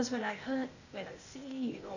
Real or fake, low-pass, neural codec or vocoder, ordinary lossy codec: fake; 7.2 kHz; codec, 16 kHz, 0.8 kbps, ZipCodec; none